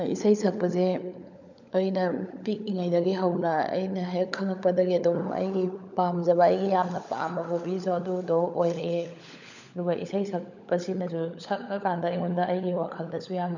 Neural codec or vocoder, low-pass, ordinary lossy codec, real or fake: codec, 16 kHz, 16 kbps, FunCodec, trained on LibriTTS, 50 frames a second; 7.2 kHz; none; fake